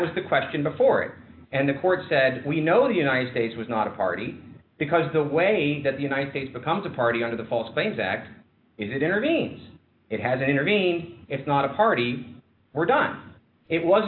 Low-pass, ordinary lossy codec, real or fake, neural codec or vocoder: 5.4 kHz; AAC, 48 kbps; real; none